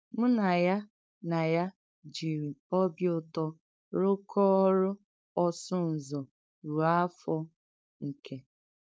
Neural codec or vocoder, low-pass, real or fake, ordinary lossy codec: codec, 16 kHz, 4.8 kbps, FACodec; none; fake; none